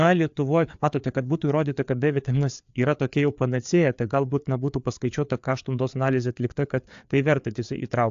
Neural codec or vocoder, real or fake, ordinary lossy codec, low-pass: codec, 16 kHz, 4 kbps, FreqCodec, larger model; fake; AAC, 64 kbps; 7.2 kHz